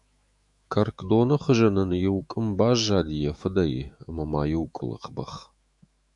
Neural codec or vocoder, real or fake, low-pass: autoencoder, 48 kHz, 128 numbers a frame, DAC-VAE, trained on Japanese speech; fake; 10.8 kHz